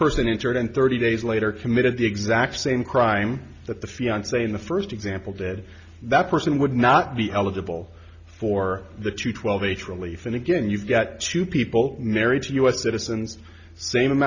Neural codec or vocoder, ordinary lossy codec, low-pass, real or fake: none; Opus, 64 kbps; 7.2 kHz; real